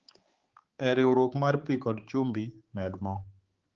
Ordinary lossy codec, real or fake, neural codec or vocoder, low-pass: Opus, 16 kbps; fake; codec, 16 kHz, 4 kbps, X-Codec, HuBERT features, trained on balanced general audio; 7.2 kHz